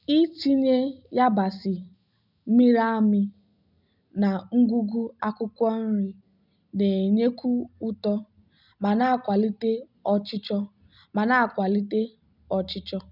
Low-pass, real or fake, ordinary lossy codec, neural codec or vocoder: 5.4 kHz; real; none; none